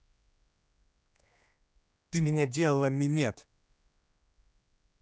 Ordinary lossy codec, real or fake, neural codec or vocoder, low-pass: none; fake; codec, 16 kHz, 2 kbps, X-Codec, HuBERT features, trained on general audio; none